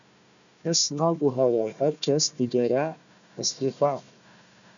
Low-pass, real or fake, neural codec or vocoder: 7.2 kHz; fake; codec, 16 kHz, 1 kbps, FunCodec, trained on Chinese and English, 50 frames a second